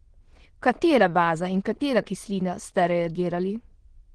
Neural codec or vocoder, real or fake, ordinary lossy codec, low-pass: autoencoder, 22.05 kHz, a latent of 192 numbers a frame, VITS, trained on many speakers; fake; Opus, 16 kbps; 9.9 kHz